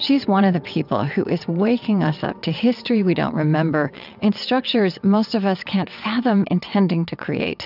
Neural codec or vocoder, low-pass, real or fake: vocoder, 22.05 kHz, 80 mel bands, WaveNeXt; 5.4 kHz; fake